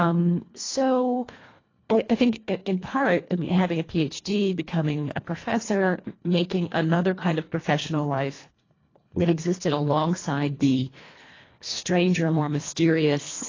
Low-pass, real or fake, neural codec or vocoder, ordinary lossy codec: 7.2 kHz; fake; codec, 24 kHz, 1.5 kbps, HILCodec; AAC, 32 kbps